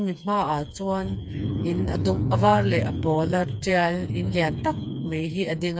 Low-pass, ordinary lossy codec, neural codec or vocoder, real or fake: none; none; codec, 16 kHz, 4 kbps, FreqCodec, smaller model; fake